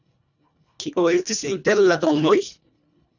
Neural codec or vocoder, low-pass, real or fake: codec, 24 kHz, 1.5 kbps, HILCodec; 7.2 kHz; fake